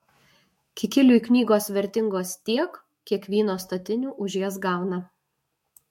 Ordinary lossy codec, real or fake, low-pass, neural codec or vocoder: MP3, 64 kbps; fake; 19.8 kHz; autoencoder, 48 kHz, 128 numbers a frame, DAC-VAE, trained on Japanese speech